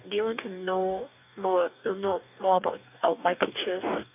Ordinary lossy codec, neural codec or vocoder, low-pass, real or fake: none; codec, 44.1 kHz, 2.6 kbps, DAC; 3.6 kHz; fake